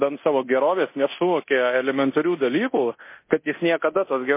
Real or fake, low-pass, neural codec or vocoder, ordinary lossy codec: fake; 3.6 kHz; codec, 24 kHz, 0.9 kbps, DualCodec; MP3, 24 kbps